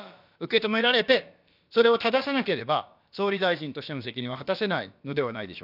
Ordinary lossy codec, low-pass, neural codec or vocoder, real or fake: none; 5.4 kHz; codec, 16 kHz, about 1 kbps, DyCAST, with the encoder's durations; fake